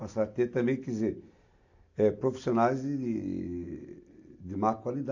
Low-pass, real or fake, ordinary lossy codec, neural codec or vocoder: 7.2 kHz; real; AAC, 48 kbps; none